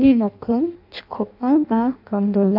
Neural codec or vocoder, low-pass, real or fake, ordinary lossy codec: codec, 16 kHz in and 24 kHz out, 0.6 kbps, FireRedTTS-2 codec; 5.4 kHz; fake; none